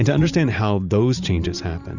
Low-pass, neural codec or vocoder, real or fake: 7.2 kHz; vocoder, 44.1 kHz, 128 mel bands every 512 samples, BigVGAN v2; fake